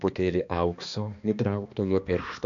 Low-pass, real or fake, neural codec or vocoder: 7.2 kHz; fake; codec, 16 kHz, 2 kbps, X-Codec, HuBERT features, trained on balanced general audio